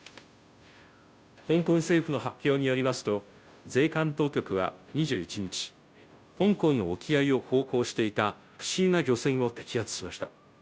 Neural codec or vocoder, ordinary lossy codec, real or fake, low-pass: codec, 16 kHz, 0.5 kbps, FunCodec, trained on Chinese and English, 25 frames a second; none; fake; none